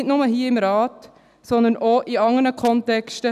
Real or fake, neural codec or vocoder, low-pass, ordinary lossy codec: real; none; 14.4 kHz; none